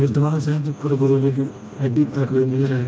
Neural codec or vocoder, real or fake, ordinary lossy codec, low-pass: codec, 16 kHz, 1 kbps, FreqCodec, smaller model; fake; none; none